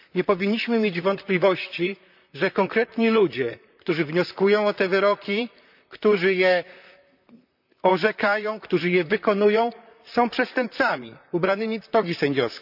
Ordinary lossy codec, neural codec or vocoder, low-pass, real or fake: none; vocoder, 44.1 kHz, 128 mel bands, Pupu-Vocoder; 5.4 kHz; fake